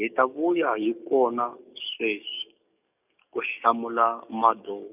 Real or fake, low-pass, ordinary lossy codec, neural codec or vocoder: fake; 3.6 kHz; none; codec, 44.1 kHz, 7.8 kbps, DAC